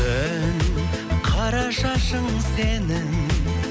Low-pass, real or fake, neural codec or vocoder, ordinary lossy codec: none; real; none; none